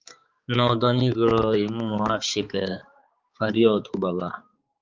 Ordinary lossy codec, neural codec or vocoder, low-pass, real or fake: Opus, 24 kbps; codec, 16 kHz, 4 kbps, X-Codec, HuBERT features, trained on balanced general audio; 7.2 kHz; fake